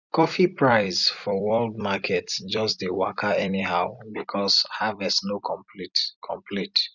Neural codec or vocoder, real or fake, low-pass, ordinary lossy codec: vocoder, 44.1 kHz, 128 mel bands every 256 samples, BigVGAN v2; fake; 7.2 kHz; none